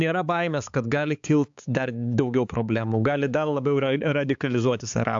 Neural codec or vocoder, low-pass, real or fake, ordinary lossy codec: codec, 16 kHz, 4 kbps, X-Codec, HuBERT features, trained on balanced general audio; 7.2 kHz; fake; AAC, 64 kbps